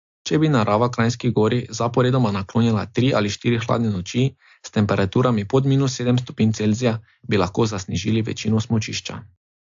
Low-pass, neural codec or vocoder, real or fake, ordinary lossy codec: 7.2 kHz; none; real; AAC, 64 kbps